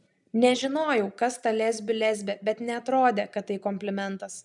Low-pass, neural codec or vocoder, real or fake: 10.8 kHz; none; real